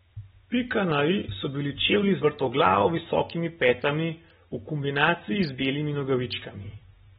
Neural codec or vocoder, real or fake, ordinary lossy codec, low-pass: none; real; AAC, 16 kbps; 19.8 kHz